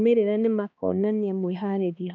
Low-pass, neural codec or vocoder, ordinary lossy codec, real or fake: 7.2 kHz; codec, 16 kHz, 2 kbps, X-Codec, HuBERT features, trained on balanced general audio; none; fake